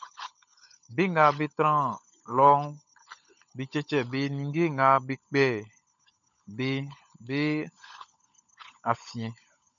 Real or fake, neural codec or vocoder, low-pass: fake; codec, 16 kHz, 16 kbps, FunCodec, trained on LibriTTS, 50 frames a second; 7.2 kHz